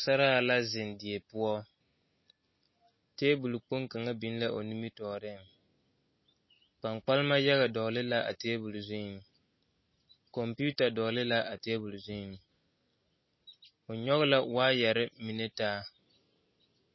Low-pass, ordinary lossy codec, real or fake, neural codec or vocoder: 7.2 kHz; MP3, 24 kbps; real; none